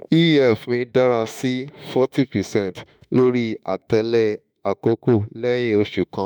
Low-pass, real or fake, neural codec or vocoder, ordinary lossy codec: none; fake; autoencoder, 48 kHz, 32 numbers a frame, DAC-VAE, trained on Japanese speech; none